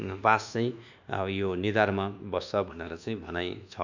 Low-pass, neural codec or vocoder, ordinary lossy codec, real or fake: 7.2 kHz; codec, 24 kHz, 1.2 kbps, DualCodec; none; fake